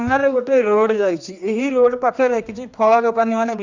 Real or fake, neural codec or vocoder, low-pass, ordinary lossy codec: fake; codec, 16 kHz, 2 kbps, X-Codec, HuBERT features, trained on general audio; 7.2 kHz; Opus, 64 kbps